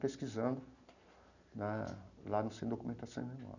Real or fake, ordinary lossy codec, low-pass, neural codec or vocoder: real; none; 7.2 kHz; none